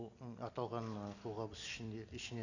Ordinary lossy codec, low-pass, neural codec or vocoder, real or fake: none; 7.2 kHz; none; real